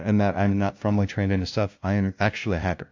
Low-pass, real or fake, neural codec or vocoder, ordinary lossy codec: 7.2 kHz; fake; codec, 16 kHz, 0.5 kbps, FunCodec, trained on LibriTTS, 25 frames a second; AAC, 48 kbps